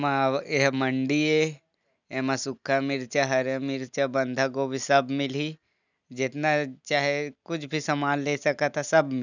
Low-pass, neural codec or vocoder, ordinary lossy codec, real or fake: 7.2 kHz; none; none; real